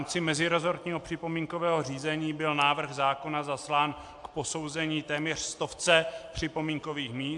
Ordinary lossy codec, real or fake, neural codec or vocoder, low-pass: AAC, 64 kbps; real; none; 10.8 kHz